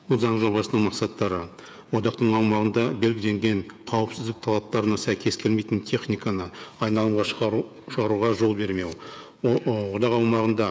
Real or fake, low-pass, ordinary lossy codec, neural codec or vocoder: fake; none; none; codec, 16 kHz, 16 kbps, FreqCodec, smaller model